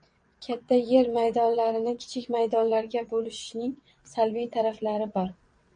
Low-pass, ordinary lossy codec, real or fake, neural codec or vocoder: 9.9 kHz; MP3, 48 kbps; fake; vocoder, 22.05 kHz, 80 mel bands, WaveNeXt